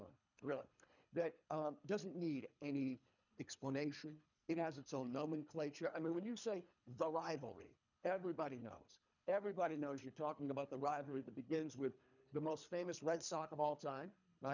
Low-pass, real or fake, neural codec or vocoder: 7.2 kHz; fake; codec, 24 kHz, 3 kbps, HILCodec